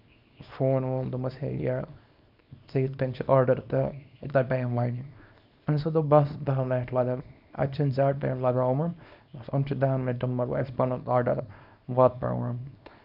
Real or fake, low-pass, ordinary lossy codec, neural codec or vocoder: fake; 5.4 kHz; none; codec, 24 kHz, 0.9 kbps, WavTokenizer, small release